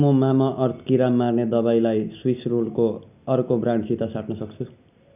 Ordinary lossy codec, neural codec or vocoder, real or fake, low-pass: none; none; real; 3.6 kHz